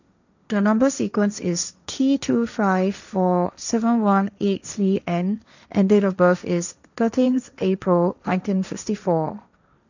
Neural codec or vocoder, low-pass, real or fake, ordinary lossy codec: codec, 16 kHz, 1.1 kbps, Voila-Tokenizer; 7.2 kHz; fake; none